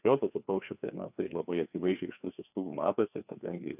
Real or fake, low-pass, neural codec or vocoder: fake; 3.6 kHz; codec, 16 kHz, 2 kbps, FreqCodec, larger model